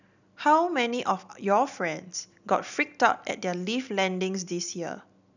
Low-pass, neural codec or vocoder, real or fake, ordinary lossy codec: 7.2 kHz; none; real; none